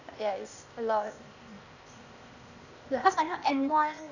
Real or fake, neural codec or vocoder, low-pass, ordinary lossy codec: fake; codec, 16 kHz, 0.8 kbps, ZipCodec; 7.2 kHz; none